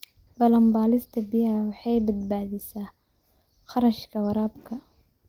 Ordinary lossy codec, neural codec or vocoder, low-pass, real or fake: Opus, 24 kbps; none; 19.8 kHz; real